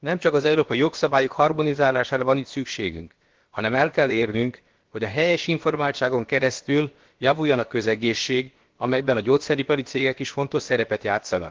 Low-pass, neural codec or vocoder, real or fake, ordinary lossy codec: 7.2 kHz; codec, 16 kHz, about 1 kbps, DyCAST, with the encoder's durations; fake; Opus, 16 kbps